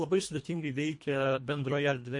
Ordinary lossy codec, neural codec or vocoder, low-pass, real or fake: MP3, 48 kbps; codec, 24 kHz, 1.5 kbps, HILCodec; 10.8 kHz; fake